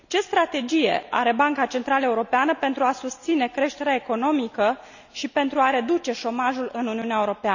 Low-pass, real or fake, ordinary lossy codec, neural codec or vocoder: 7.2 kHz; real; none; none